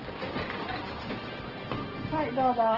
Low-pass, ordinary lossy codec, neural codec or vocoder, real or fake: 5.4 kHz; Opus, 16 kbps; none; real